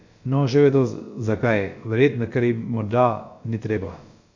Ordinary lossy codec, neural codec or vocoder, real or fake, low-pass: MP3, 64 kbps; codec, 16 kHz, about 1 kbps, DyCAST, with the encoder's durations; fake; 7.2 kHz